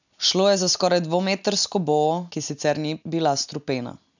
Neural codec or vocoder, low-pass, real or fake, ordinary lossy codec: none; 7.2 kHz; real; none